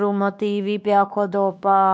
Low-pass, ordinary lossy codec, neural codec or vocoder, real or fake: none; none; codec, 16 kHz, 2 kbps, X-Codec, WavLM features, trained on Multilingual LibriSpeech; fake